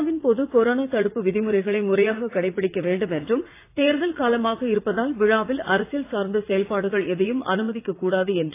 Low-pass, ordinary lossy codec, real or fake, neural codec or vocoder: 3.6 kHz; AAC, 24 kbps; fake; vocoder, 44.1 kHz, 80 mel bands, Vocos